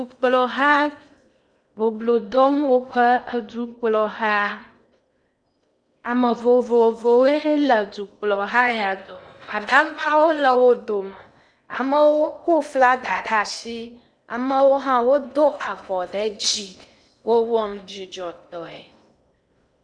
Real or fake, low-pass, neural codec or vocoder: fake; 9.9 kHz; codec, 16 kHz in and 24 kHz out, 0.8 kbps, FocalCodec, streaming, 65536 codes